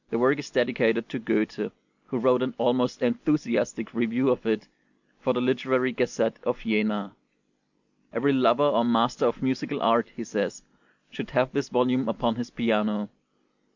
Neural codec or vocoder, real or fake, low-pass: none; real; 7.2 kHz